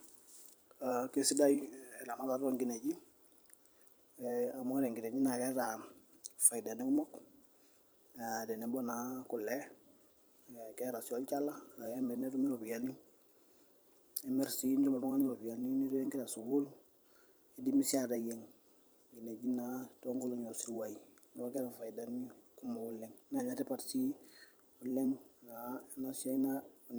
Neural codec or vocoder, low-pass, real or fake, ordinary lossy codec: vocoder, 44.1 kHz, 128 mel bands every 512 samples, BigVGAN v2; none; fake; none